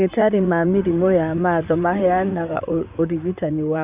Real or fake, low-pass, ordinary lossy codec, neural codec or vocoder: fake; 3.6 kHz; Opus, 64 kbps; vocoder, 44.1 kHz, 128 mel bands, Pupu-Vocoder